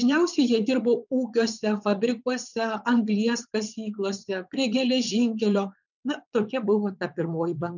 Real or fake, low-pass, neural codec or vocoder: fake; 7.2 kHz; vocoder, 22.05 kHz, 80 mel bands, WaveNeXt